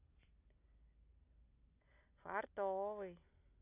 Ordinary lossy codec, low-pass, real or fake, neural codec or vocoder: AAC, 24 kbps; 3.6 kHz; real; none